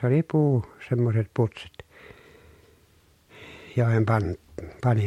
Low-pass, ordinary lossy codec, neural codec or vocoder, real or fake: 19.8 kHz; MP3, 64 kbps; none; real